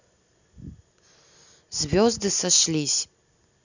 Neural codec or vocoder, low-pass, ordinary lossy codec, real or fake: none; 7.2 kHz; none; real